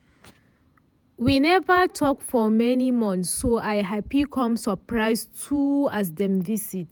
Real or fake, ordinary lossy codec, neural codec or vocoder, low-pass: fake; none; vocoder, 48 kHz, 128 mel bands, Vocos; none